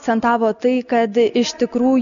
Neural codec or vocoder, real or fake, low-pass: none; real; 7.2 kHz